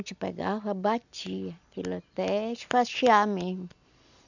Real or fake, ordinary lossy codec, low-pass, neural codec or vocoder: real; none; 7.2 kHz; none